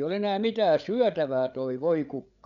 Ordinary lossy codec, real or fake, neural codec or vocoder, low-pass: none; fake; codec, 16 kHz, 8 kbps, FreqCodec, larger model; 7.2 kHz